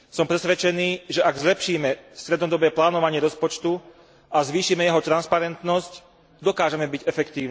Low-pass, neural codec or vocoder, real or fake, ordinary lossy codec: none; none; real; none